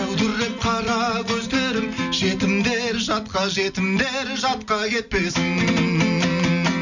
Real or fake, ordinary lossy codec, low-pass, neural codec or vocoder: real; none; 7.2 kHz; none